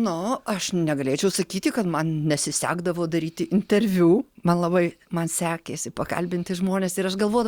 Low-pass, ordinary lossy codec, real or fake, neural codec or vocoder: 19.8 kHz; Opus, 64 kbps; real; none